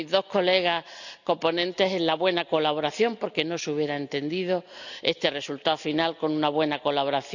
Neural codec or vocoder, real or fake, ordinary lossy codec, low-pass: none; real; none; 7.2 kHz